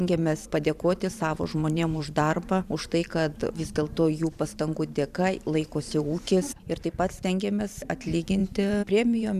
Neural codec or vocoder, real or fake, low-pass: vocoder, 44.1 kHz, 128 mel bands every 512 samples, BigVGAN v2; fake; 14.4 kHz